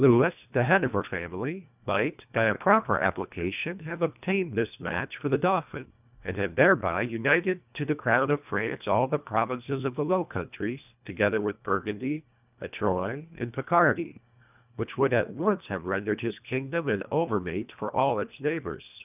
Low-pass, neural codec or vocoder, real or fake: 3.6 kHz; codec, 24 kHz, 1.5 kbps, HILCodec; fake